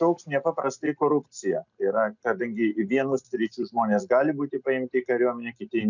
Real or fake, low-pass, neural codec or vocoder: real; 7.2 kHz; none